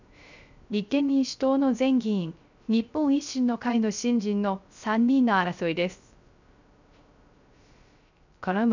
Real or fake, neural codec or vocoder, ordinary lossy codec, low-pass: fake; codec, 16 kHz, 0.3 kbps, FocalCodec; none; 7.2 kHz